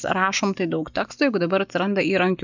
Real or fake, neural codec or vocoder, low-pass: real; none; 7.2 kHz